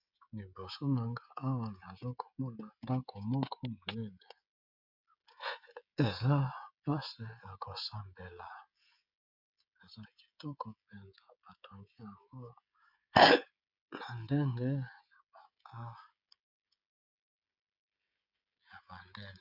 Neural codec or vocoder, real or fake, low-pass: codec, 16 kHz, 16 kbps, FreqCodec, smaller model; fake; 5.4 kHz